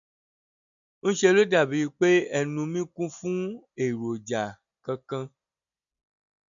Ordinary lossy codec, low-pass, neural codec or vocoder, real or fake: none; 7.2 kHz; none; real